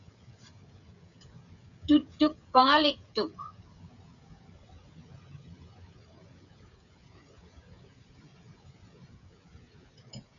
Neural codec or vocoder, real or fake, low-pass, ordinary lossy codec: codec, 16 kHz, 16 kbps, FreqCodec, smaller model; fake; 7.2 kHz; MP3, 96 kbps